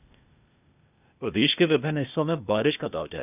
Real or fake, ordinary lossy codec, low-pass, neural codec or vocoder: fake; none; 3.6 kHz; codec, 16 kHz, 0.8 kbps, ZipCodec